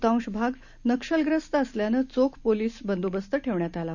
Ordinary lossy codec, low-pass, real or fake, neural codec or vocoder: none; 7.2 kHz; real; none